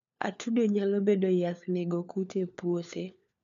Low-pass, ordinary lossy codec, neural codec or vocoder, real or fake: 7.2 kHz; none; codec, 16 kHz, 4 kbps, FunCodec, trained on LibriTTS, 50 frames a second; fake